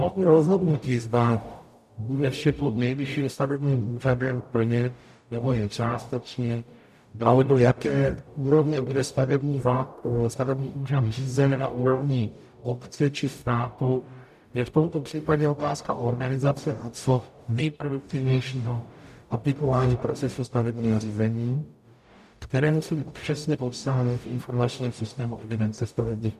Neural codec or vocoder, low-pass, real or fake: codec, 44.1 kHz, 0.9 kbps, DAC; 14.4 kHz; fake